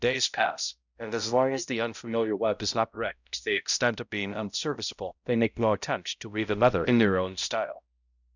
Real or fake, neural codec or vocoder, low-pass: fake; codec, 16 kHz, 0.5 kbps, X-Codec, HuBERT features, trained on balanced general audio; 7.2 kHz